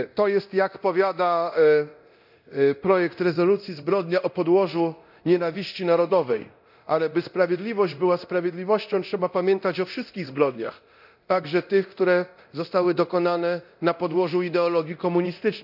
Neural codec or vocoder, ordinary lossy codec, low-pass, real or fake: codec, 24 kHz, 0.9 kbps, DualCodec; none; 5.4 kHz; fake